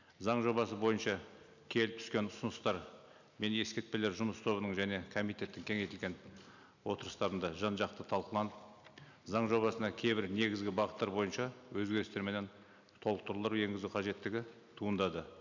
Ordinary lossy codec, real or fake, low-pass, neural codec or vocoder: none; real; 7.2 kHz; none